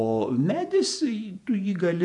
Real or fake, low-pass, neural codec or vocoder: fake; 10.8 kHz; vocoder, 48 kHz, 128 mel bands, Vocos